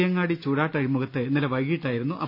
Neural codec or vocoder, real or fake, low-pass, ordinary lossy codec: none; real; 5.4 kHz; none